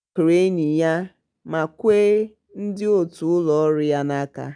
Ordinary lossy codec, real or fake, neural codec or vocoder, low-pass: none; real; none; 9.9 kHz